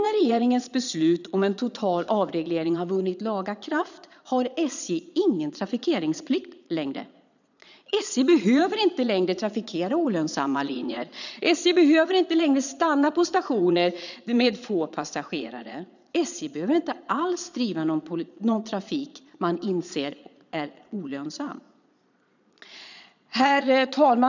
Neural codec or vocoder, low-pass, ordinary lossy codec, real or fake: vocoder, 22.05 kHz, 80 mel bands, Vocos; 7.2 kHz; none; fake